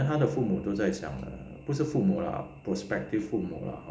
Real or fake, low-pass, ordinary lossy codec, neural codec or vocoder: real; none; none; none